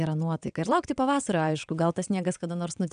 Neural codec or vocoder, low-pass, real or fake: vocoder, 22.05 kHz, 80 mel bands, WaveNeXt; 9.9 kHz; fake